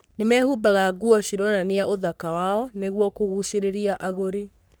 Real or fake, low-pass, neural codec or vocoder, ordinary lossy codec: fake; none; codec, 44.1 kHz, 3.4 kbps, Pupu-Codec; none